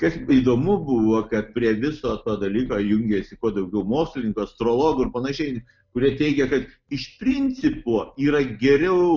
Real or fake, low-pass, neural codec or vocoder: real; 7.2 kHz; none